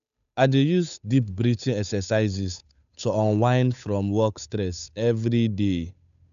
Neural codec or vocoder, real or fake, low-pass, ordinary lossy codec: codec, 16 kHz, 8 kbps, FunCodec, trained on Chinese and English, 25 frames a second; fake; 7.2 kHz; none